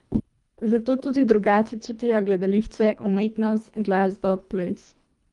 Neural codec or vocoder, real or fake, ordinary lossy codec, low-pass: codec, 24 kHz, 1.5 kbps, HILCodec; fake; Opus, 32 kbps; 10.8 kHz